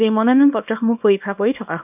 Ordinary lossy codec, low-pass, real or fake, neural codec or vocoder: none; 3.6 kHz; fake; codec, 24 kHz, 0.9 kbps, WavTokenizer, small release